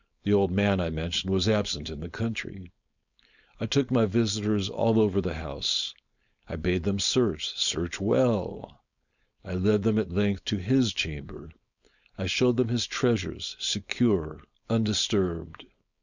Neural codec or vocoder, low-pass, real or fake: codec, 16 kHz, 4.8 kbps, FACodec; 7.2 kHz; fake